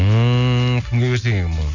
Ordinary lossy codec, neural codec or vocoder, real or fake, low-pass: none; none; real; 7.2 kHz